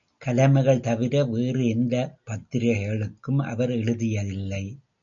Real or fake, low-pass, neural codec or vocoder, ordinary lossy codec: real; 7.2 kHz; none; MP3, 96 kbps